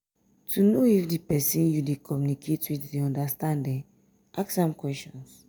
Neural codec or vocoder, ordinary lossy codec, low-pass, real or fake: none; none; none; real